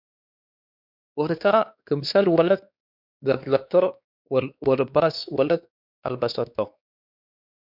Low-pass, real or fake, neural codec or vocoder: 5.4 kHz; fake; codec, 16 kHz, 2 kbps, X-Codec, WavLM features, trained on Multilingual LibriSpeech